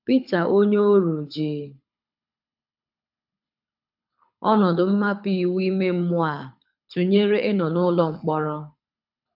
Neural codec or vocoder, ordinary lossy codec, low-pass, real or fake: codec, 24 kHz, 6 kbps, HILCodec; AAC, 48 kbps; 5.4 kHz; fake